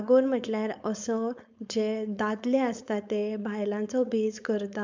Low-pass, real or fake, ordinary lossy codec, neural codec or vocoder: 7.2 kHz; fake; MP3, 64 kbps; codec, 16 kHz, 4.8 kbps, FACodec